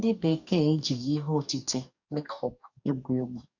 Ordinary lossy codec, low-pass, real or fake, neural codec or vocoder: none; 7.2 kHz; fake; codec, 44.1 kHz, 2.6 kbps, DAC